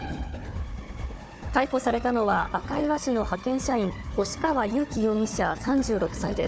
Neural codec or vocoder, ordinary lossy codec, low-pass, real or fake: codec, 16 kHz, 4 kbps, FunCodec, trained on Chinese and English, 50 frames a second; none; none; fake